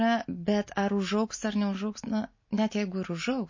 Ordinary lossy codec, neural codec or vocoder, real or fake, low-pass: MP3, 32 kbps; none; real; 7.2 kHz